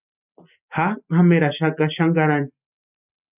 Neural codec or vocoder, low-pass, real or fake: none; 3.6 kHz; real